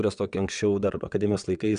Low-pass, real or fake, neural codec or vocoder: 9.9 kHz; fake; vocoder, 22.05 kHz, 80 mel bands, WaveNeXt